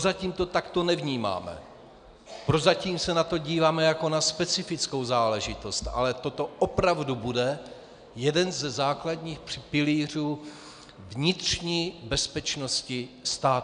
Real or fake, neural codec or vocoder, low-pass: real; none; 9.9 kHz